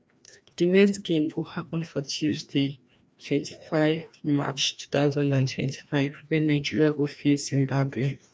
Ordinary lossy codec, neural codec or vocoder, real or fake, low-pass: none; codec, 16 kHz, 1 kbps, FreqCodec, larger model; fake; none